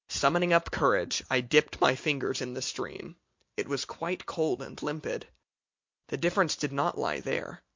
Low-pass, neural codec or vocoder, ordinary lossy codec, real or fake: 7.2 kHz; none; MP3, 48 kbps; real